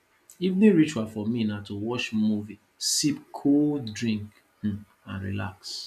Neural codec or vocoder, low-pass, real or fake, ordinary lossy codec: none; 14.4 kHz; real; none